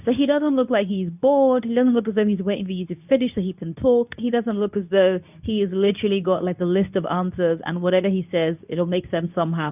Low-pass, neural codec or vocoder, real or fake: 3.6 kHz; codec, 24 kHz, 0.9 kbps, WavTokenizer, medium speech release version 2; fake